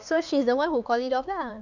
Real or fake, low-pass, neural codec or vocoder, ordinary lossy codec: fake; 7.2 kHz; codec, 16 kHz, 4 kbps, X-Codec, HuBERT features, trained on LibriSpeech; none